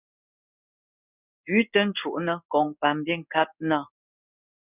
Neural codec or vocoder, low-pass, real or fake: codec, 16 kHz in and 24 kHz out, 1 kbps, XY-Tokenizer; 3.6 kHz; fake